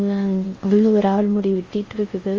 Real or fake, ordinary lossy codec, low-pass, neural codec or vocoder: fake; Opus, 32 kbps; 7.2 kHz; codec, 16 kHz in and 24 kHz out, 0.6 kbps, FocalCodec, streaming, 4096 codes